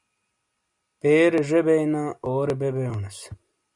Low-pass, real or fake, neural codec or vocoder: 10.8 kHz; real; none